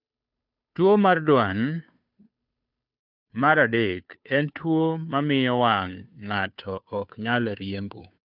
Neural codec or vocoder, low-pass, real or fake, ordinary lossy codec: codec, 16 kHz, 2 kbps, FunCodec, trained on Chinese and English, 25 frames a second; 5.4 kHz; fake; none